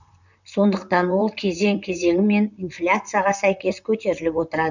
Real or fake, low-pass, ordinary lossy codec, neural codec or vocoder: fake; 7.2 kHz; none; vocoder, 44.1 kHz, 128 mel bands, Pupu-Vocoder